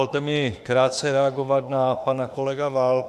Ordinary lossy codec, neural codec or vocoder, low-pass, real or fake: AAC, 96 kbps; autoencoder, 48 kHz, 32 numbers a frame, DAC-VAE, trained on Japanese speech; 14.4 kHz; fake